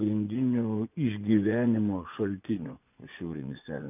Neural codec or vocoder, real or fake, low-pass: vocoder, 24 kHz, 100 mel bands, Vocos; fake; 3.6 kHz